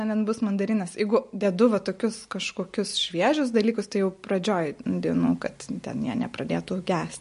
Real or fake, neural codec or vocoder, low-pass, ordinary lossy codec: real; none; 10.8 kHz; MP3, 48 kbps